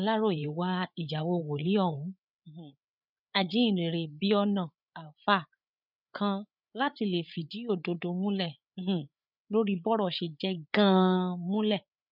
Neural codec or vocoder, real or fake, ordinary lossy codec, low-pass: codec, 16 kHz, 8 kbps, FreqCodec, larger model; fake; none; 5.4 kHz